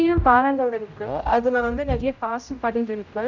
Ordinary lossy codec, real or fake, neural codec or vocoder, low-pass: none; fake; codec, 16 kHz, 0.5 kbps, X-Codec, HuBERT features, trained on general audio; 7.2 kHz